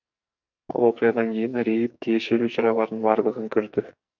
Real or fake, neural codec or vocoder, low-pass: fake; codec, 44.1 kHz, 2.6 kbps, SNAC; 7.2 kHz